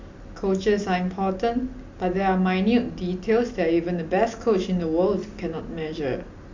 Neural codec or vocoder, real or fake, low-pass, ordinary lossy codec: none; real; 7.2 kHz; MP3, 64 kbps